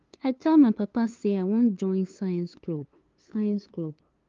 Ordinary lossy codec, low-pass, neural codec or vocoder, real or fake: Opus, 32 kbps; 7.2 kHz; codec, 16 kHz, 2 kbps, FunCodec, trained on LibriTTS, 25 frames a second; fake